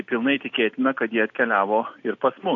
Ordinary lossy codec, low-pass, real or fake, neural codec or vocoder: AAC, 48 kbps; 7.2 kHz; real; none